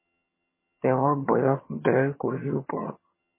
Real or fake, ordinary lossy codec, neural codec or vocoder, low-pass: fake; MP3, 16 kbps; vocoder, 22.05 kHz, 80 mel bands, HiFi-GAN; 3.6 kHz